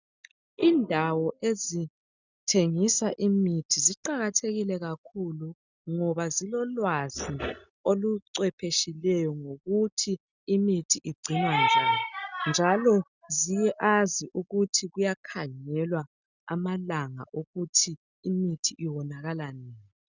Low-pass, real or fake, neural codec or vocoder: 7.2 kHz; real; none